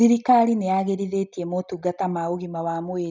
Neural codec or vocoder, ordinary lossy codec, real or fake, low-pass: none; none; real; none